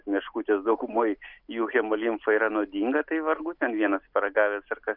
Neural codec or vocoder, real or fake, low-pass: none; real; 5.4 kHz